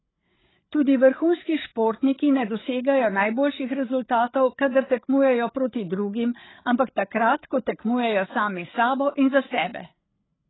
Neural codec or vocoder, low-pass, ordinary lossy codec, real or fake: codec, 16 kHz, 8 kbps, FreqCodec, larger model; 7.2 kHz; AAC, 16 kbps; fake